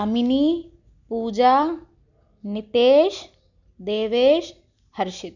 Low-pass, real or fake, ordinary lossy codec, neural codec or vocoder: 7.2 kHz; real; none; none